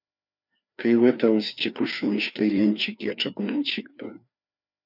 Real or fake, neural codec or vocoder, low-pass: fake; codec, 16 kHz, 2 kbps, FreqCodec, larger model; 5.4 kHz